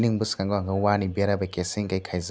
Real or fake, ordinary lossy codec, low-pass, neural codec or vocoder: real; none; none; none